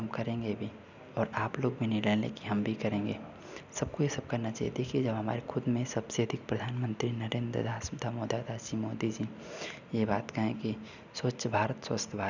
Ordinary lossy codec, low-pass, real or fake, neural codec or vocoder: none; 7.2 kHz; real; none